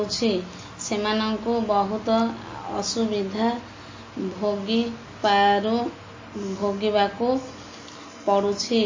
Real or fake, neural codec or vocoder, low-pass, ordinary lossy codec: real; none; 7.2 kHz; MP3, 32 kbps